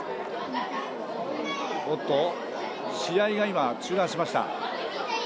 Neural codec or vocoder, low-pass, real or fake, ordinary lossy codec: none; none; real; none